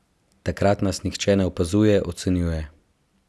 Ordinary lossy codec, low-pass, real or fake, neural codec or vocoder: none; none; real; none